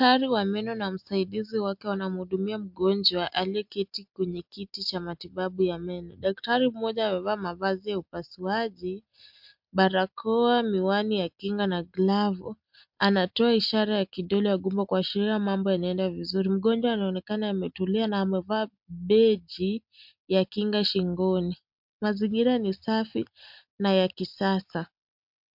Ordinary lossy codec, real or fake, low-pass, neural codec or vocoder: MP3, 48 kbps; real; 5.4 kHz; none